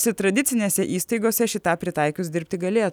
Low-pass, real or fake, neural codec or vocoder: 19.8 kHz; real; none